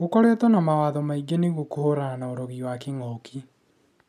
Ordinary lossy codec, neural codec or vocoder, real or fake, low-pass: none; none; real; 14.4 kHz